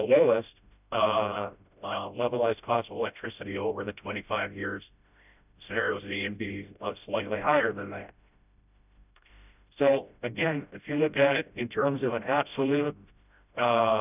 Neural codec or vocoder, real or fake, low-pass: codec, 16 kHz, 0.5 kbps, FreqCodec, smaller model; fake; 3.6 kHz